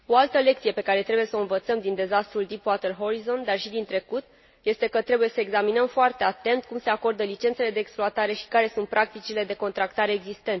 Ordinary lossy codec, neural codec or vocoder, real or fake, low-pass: MP3, 24 kbps; none; real; 7.2 kHz